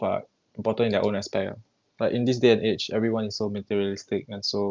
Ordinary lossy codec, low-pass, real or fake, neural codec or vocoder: Opus, 24 kbps; 7.2 kHz; real; none